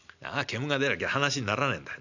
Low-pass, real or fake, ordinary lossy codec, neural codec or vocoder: 7.2 kHz; real; none; none